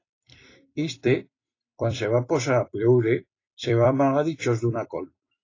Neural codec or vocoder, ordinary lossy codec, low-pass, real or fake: vocoder, 44.1 kHz, 128 mel bands every 256 samples, BigVGAN v2; AAC, 32 kbps; 7.2 kHz; fake